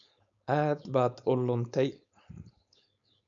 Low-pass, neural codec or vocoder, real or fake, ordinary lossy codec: 7.2 kHz; codec, 16 kHz, 4.8 kbps, FACodec; fake; none